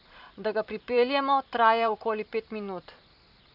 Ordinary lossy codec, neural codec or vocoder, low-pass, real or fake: none; vocoder, 44.1 kHz, 128 mel bands every 512 samples, BigVGAN v2; 5.4 kHz; fake